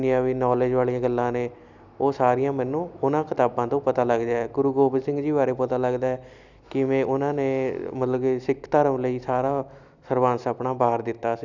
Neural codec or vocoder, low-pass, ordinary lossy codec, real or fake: none; 7.2 kHz; none; real